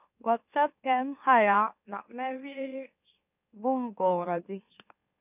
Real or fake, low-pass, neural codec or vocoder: fake; 3.6 kHz; autoencoder, 44.1 kHz, a latent of 192 numbers a frame, MeloTTS